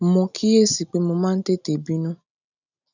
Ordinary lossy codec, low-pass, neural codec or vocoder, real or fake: none; 7.2 kHz; none; real